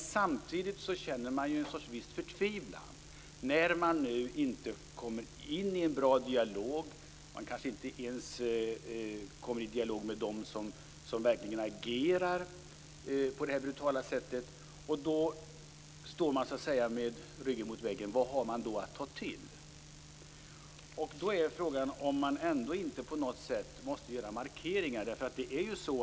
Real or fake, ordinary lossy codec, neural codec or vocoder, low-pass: real; none; none; none